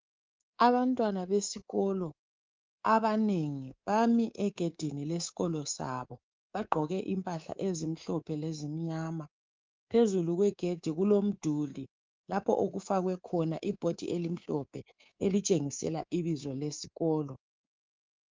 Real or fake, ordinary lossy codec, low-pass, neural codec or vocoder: fake; Opus, 24 kbps; 7.2 kHz; codec, 24 kHz, 3.1 kbps, DualCodec